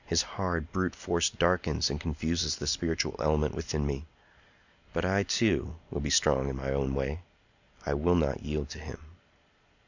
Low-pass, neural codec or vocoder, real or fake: 7.2 kHz; none; real